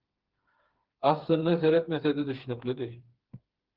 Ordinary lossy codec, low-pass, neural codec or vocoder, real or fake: Opus, 16 kbps; 5.4 kHz; codec, 16 kHz, 4 kbps, FreqCodec, smaller model; fake